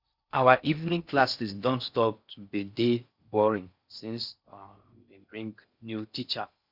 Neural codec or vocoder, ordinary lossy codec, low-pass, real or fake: codec, 16 kHz in and 24 kHz out, 0.6 kbps, FocalCodec, streaming, 4096 codes; Opus, 64 kbps; 5.4 kHz; fake